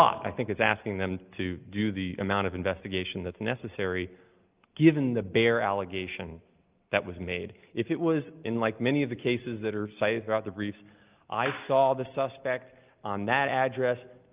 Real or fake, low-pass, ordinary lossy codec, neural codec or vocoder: real; 3.6 kHz; Opus, 24 kbps; none